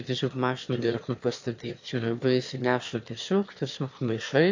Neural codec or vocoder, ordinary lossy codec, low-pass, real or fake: autoencoder, 22.05 kHz, a latent of 192 numbers a frame, VITS, trained on one speaker; AAC, 48 kbps; 7.2 kHz; fake